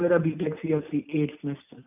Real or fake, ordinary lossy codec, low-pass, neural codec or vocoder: real; none; 3.6 kHz; none